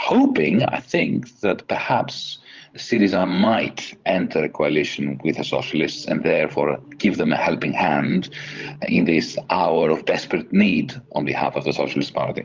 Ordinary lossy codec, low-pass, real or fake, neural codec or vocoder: Opus, 32 kbps; 7.2 kHz; fake; codec, 16 kHz, 16 kbps, FreqCodec, larger model